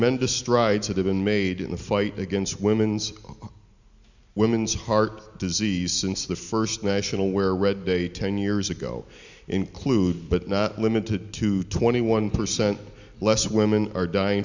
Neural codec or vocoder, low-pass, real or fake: none; 7.2 kHz; real